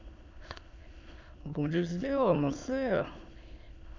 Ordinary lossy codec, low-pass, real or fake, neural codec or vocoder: MP3, 64 kbps; 7.2 kHz; fake; autoencoder, 22.05 kHz, a latent of 192 numbers a frame, VITS, trained on many speakers